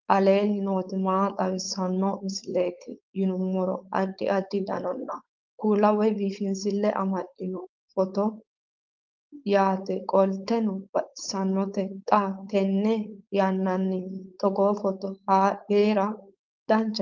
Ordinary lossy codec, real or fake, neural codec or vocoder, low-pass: Opus, 32 kbps; fake; codec, 16 kHz, 4.8 kbps, FACodec; 7.2 kHz